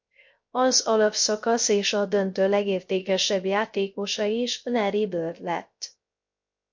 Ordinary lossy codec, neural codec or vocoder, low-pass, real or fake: MP3, 48 kbps; codec, 16 kHz, 0.3 kbps, FocalCodec; 7.2 kHz; fake